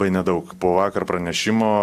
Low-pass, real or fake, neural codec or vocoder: 14.4 kHz; real; none